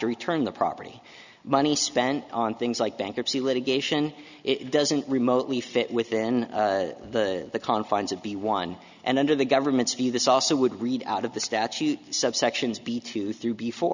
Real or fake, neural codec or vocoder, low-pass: real; none; 7.2 kHz